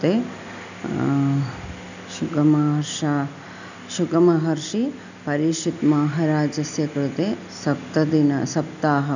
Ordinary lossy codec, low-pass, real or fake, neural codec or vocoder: none; 7.2 kHz; real; none